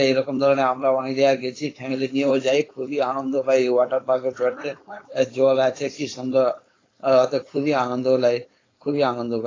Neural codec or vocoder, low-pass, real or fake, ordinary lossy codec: codec, 16 kHz, 4.8 kbps, FACodec; 7.2 kHz; fake; AAC, 32 kbps